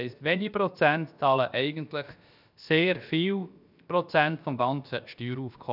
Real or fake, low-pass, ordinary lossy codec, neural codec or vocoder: fake; 5.4 kHz; none; codec, 16 kHz, about 1 kbps, DyCAST, with the encoder's durations